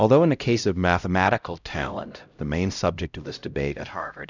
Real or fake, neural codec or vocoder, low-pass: fake; codec, 16 kHz, 0.5 kbps, X-Codec, HuBERT features, trained on LibriSpeech; 7.2 kHz